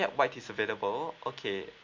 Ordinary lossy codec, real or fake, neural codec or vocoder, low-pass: MP3, 48 kbps; real; none; 7.2 kHz